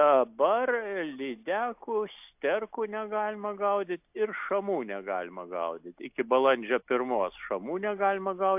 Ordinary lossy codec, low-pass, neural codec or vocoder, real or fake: AAC, 32 kbps; 3.6 kHz; none; real